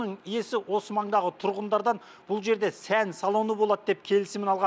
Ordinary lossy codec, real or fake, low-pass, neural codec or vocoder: none; real; none; none